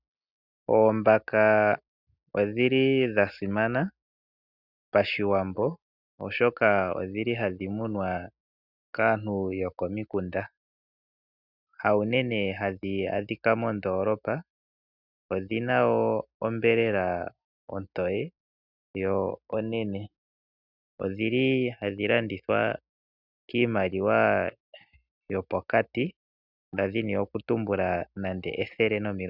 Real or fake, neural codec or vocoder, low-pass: real; none; 5.4 kHz